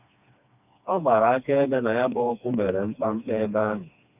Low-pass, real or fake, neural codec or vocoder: 3.6 kHz; fake; codec, 16 kHz, 2 kbps, FreqCodec, smaller model